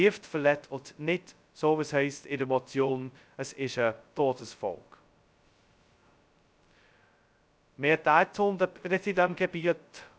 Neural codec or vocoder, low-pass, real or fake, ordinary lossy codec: codec, 16 kHz, 0.2 kbps, FocalCodec; none; fake; none